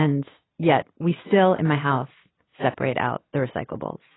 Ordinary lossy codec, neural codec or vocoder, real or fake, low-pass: AAC, 16 kbps; none; real; 7.2 kHz